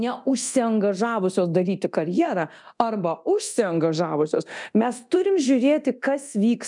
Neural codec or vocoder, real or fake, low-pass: codec, 24 kHz, 0.9 kbps, DualCodec; fake; 10.8 kHz